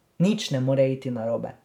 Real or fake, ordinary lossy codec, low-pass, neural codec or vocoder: fake; none; 19.8 kHz; vocoder, 44.1 kHz, 128 mel bands every 512 samples, BigVGAN v2